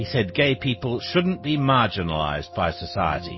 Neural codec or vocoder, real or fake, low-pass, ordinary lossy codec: none; real; 7.2 kHz; MP3, 24 kbps